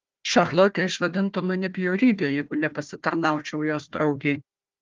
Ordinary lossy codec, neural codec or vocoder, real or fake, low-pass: Opus, 32 kbps; codec, 16 kHz, 1 kbps, FunCodec, trained on Chinese and English, 50 frames a second; fake; 7.2 kHz